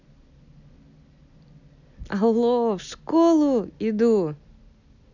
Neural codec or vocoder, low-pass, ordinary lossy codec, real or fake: none; 7.2 kHz; none; real